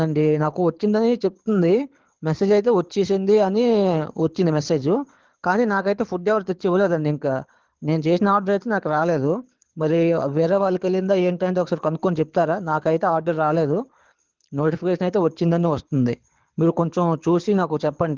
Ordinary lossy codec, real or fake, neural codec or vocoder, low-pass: Opus, 16 kbps; fake; codec, 24 kHz, 6 kbps, HILCodec; 7.2 kHz